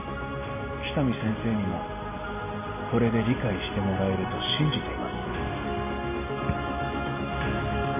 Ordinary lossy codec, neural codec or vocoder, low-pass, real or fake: MP3, 16 kbps; none; 3.6 kHz; real